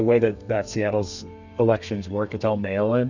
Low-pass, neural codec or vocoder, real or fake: 7.2 kHz; codec, 32 kHz, 1.9 kbps, SNAC; fake